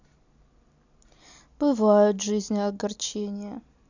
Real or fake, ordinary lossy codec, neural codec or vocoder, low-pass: real; none; none; 7.2 kHz